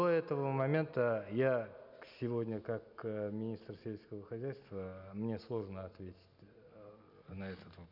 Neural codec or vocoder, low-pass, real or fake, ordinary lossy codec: none; 5.4 kHz; real; none